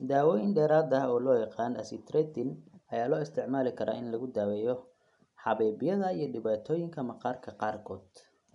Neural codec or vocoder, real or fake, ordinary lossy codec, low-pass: none; real; none; 10.8 kHz